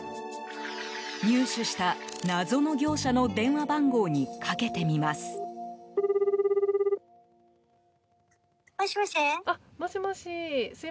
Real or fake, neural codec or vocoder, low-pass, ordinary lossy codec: real; none; none; none